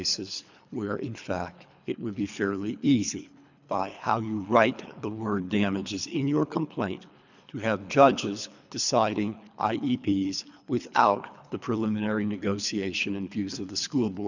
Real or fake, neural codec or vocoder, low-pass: fake; codec, 24 kHz, 3 kbps, HILCodec; 7.2 kHz